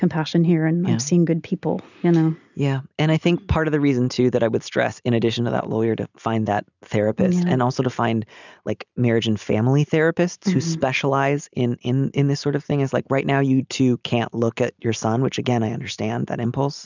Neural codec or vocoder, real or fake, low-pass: none; real; 7.2 kHz